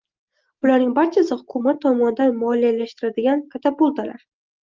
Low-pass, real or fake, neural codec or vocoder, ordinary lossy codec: 7.2 kHz; real; none; Opus, 24 kbps